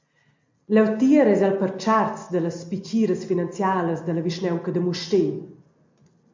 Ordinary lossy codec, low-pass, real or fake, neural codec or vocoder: MP3, 48 kbps; 7.2 kHz; real; none